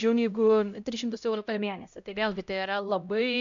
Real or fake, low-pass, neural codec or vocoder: fake; 7.2 kHz; codec, 16 kHz, 0.5 kbps, X-Codec, HuBERT features, trained on LibriSpeech